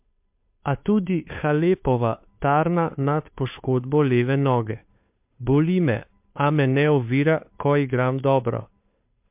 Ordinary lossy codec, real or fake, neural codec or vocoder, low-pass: MP3, 32 kbps; fake; codec, 16 kHz, 2 kbps, FunCodec, trained on Chinese and English, 25 frames a second; 3.6 kHz